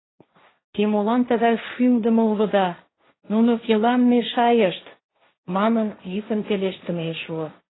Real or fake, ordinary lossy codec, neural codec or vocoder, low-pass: fake; AAC, 16 kbps; codec, 16 kHz, 1.1 kbps, Voila-Tokenizer; 7.2 kHz